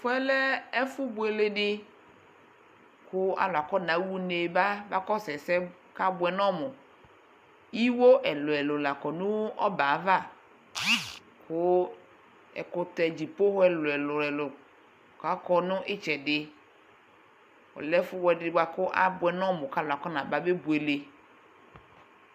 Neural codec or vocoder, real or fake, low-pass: none; real; 14.4 kHz